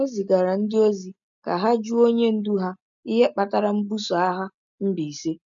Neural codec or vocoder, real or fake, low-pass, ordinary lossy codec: none; real; 7.2 kHz; none